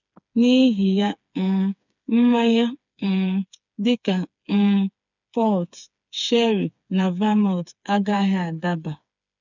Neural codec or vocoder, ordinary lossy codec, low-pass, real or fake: codec, 16 kHz, 4 kbps, FreqCodec, smaller model; none; 7.2 kHz; fake